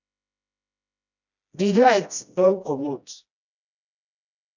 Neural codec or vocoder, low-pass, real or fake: codec, 16 kHz, 1 kbps, FreqCodec, smaller model; 7.2 kHz; fake